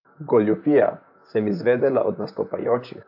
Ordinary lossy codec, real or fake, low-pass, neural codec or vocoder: AAC, 48 kbps; fake; 5.4 kHz; vocoder, 44.1 kHz, 128 mel bands, Pupu-Vocoder